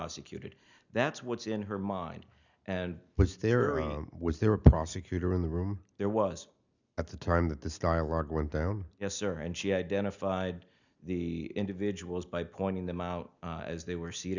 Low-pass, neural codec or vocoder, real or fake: 7.2 kHz; none; real